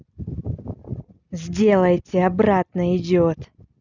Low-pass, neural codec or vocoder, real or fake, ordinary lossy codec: 7.2 kHz; none; real; none